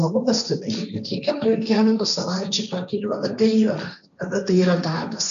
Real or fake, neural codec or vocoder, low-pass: fake; codec, 16 kHz, 1.1 kbps, Voila-Tokenizer; 7.2 kHz